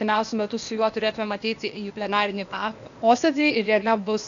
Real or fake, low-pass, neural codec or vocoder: fake; 7.2 kHz; codec, 16 kHz, 0.8 kbps, ZipCodec